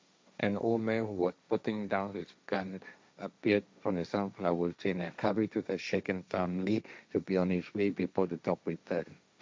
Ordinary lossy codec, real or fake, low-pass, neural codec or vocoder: none; fake; none; codec, 16 kHz, 1.1 kbps, Voila-Tokenizer